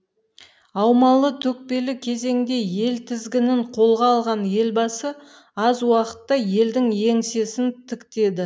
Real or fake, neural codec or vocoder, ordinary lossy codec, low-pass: real; none; none; none